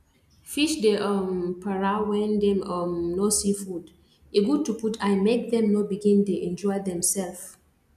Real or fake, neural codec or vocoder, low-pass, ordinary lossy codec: real; none; 14.4 kHz; none